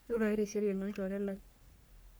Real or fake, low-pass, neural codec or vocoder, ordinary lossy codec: fake; none; codec, 44.1 kHz, 3.4 kbps, Pupu-Codec; none